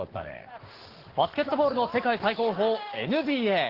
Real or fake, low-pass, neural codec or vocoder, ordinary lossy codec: fake; 5.4 kHz; codec, 44.1 kHz, 7.8 kbps, Pupu-Codec; Opus, 16 kbps